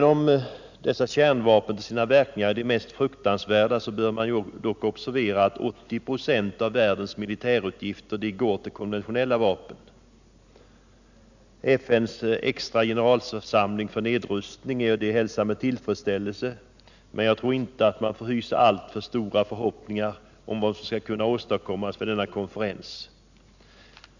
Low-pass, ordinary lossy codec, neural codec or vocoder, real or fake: 7.2 kHz; none; none; real